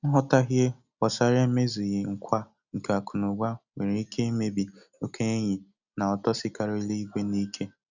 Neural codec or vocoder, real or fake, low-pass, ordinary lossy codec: none; real; 7.2 kHz; none